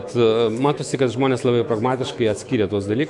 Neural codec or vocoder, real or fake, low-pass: autoencoder, 48 kHz, 128 numbers a frame, DAC-VAE, trained on Japanese speech; fake; 10.8 kHz